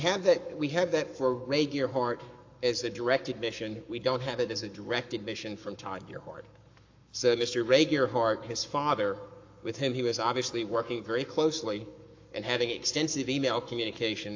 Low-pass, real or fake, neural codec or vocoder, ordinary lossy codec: 7.2 kHz; fake; codec, 44.1 kHz, 7.8 kbps, Pupu-Codec; AAC, 48 kbps